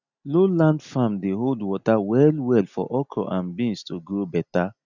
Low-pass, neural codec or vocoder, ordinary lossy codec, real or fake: 7.2 kHz; none; none; real